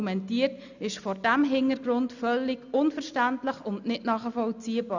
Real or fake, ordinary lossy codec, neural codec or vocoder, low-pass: real; none; none; 7.2 kHz